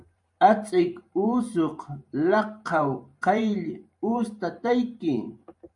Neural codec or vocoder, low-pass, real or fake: vocoder, 44.1 kHz, 128 mel bands every 512 samples, BigVGAN v2; 10.8 kHz; fake